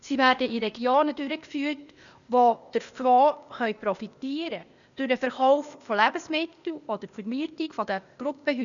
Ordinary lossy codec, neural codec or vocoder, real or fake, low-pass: none; codec, 16 kHz, 0.8 kbps, ZipCodec; fake; 7.2 kHz